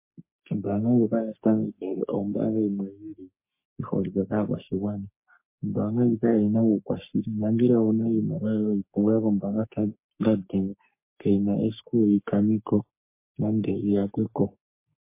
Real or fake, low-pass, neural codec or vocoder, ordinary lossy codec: fake; 3.6 kHz; codec, 44.1 kHz, 3.4 kbps, Pupu-Codec; MP3, 24 kbps